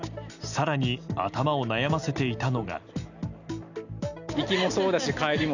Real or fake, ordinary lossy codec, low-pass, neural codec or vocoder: real; none; 7.2 kHz; none